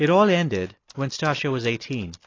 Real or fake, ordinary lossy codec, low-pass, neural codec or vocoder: real; AAC, 32 kbps; 7.2 kHz; none